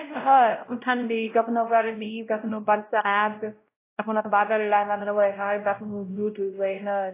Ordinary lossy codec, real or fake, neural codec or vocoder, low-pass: AAC, 24 kbps; fake; codec, 16 kHz, 0.5 kbps, X-Codec, WavLM features, trained on Multilingual LibriSpeech; 3.6 kHz